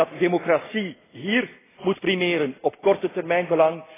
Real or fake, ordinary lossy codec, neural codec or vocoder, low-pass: real; AAC, 16 kbps; none; 3.6 kHz